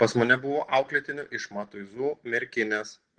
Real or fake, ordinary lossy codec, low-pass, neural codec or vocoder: real; Opus, 16 kbps; 9.9 kHz; none